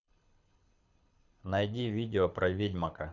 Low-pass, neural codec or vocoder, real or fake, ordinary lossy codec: 7.2 kHz; codec, 24 kHz, 6 kbps, HILCodec; fake; none